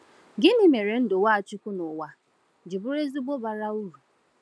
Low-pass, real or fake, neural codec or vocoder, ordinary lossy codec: none; real; none; none